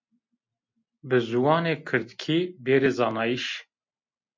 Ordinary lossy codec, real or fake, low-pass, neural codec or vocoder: MP3, 48 kbps; real; 7.2 kHz; none